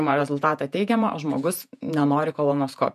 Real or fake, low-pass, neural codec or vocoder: fake; 14.4 kHz; vocoder, 48 kHz, 128 mel bands, Vocos